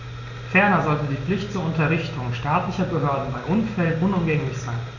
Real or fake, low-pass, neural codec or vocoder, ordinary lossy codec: real; 7.2 kHz; none; none